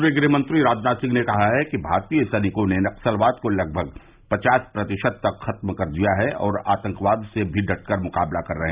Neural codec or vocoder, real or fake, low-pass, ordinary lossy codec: none; real; 3.6 kHz; Opus, 64 kbps